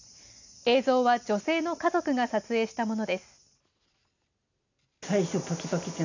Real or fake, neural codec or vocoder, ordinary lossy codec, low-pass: real; none; none; 7.2 kHz